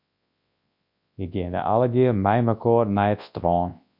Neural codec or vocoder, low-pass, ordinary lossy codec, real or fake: codec, 24 kHz, 0.9 kbps, WavTokenizer, large speech release; 5.4 kHz; MP3, 48 kbps; fake